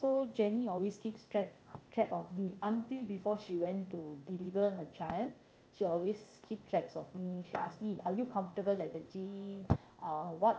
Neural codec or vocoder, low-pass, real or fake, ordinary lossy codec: codec, 16 kHz, 0.8 kbps, ZipCodec; none; fake; none